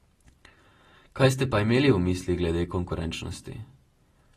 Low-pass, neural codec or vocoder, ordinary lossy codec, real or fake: 19.8 kHz; none; AAC, 32 kbps; real